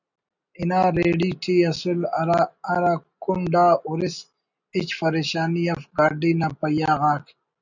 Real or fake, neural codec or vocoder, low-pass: real; none; 7.2 kHz